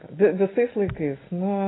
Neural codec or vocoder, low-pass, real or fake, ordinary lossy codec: none; 7.2 kHz; real; AAC, 16 kbps